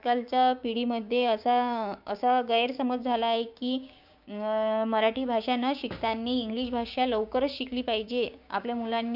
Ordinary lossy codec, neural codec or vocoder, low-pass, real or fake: none; codec, 16 kHz, 6 kbps, DAC; 5.4 kHz; fake